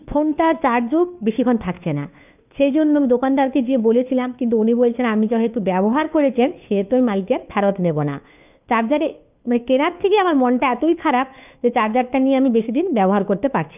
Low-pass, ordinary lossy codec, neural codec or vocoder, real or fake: 3.6 kHz; none; codec, 16 kHz, 2 kbps, FunCodec, trained on Chinese and English, 25 frames a second; fake